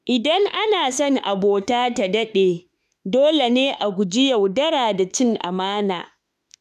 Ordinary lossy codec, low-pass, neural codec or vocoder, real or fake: none; 14.4 kHz; autoencoder, 48 kHz, 32 numbers a frame, DAC-VAE, trained on Japanese speech; fake